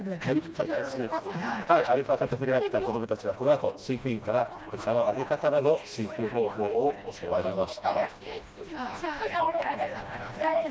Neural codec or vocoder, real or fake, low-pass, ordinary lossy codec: codec, 16 kHz, 1 kbps, FreqCodec, smaller model; fake; none; none